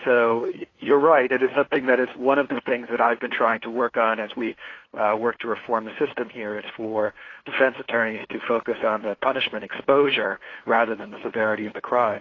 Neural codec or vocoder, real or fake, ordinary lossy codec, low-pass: codec, 16 kHz, 2 kbps, FunCodec, trained on LibriTTS, 25 frames a second; fake; AAC, 32 kbps; 7.2 kHz